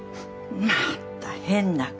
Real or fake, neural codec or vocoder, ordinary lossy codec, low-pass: real; none; none; none